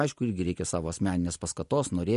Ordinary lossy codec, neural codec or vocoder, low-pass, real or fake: MP3, 48 kbps; none; 14.4 kHz; real